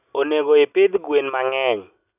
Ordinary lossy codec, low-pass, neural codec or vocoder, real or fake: none; 3.6 kHz; none; real